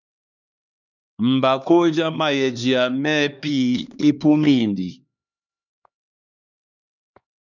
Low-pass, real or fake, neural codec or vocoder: 7.2 kHz; fake; codec, 16 kHz, 2 kbps, X-Codec, HuBERT features, trained on LibriSpeech